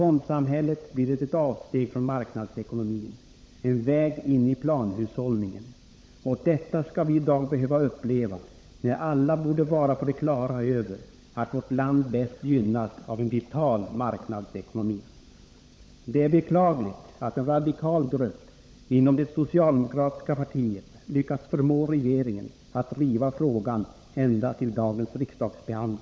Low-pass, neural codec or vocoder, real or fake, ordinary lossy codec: none; codec, 16 kHz, 16 kbps, FunCodec, trained on LibriTTS, 50 frames a second; fake; none